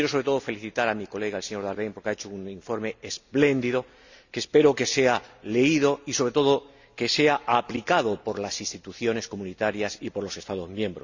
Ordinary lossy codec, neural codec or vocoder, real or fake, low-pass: none; none; real; 7.2 kHz